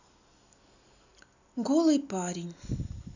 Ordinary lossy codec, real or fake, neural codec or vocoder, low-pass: none; real; none; 7.2 kHz